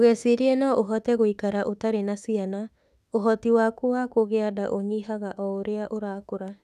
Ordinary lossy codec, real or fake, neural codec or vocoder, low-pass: none; fake; autoencoder, 48 kHz, 32 numbers a frame, DAC-VAE, trained on Japanese speech; 14.4 kHz